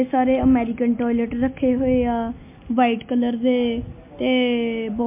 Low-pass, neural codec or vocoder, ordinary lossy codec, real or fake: 3.6 kHz; none; MP3, 24 kbps; real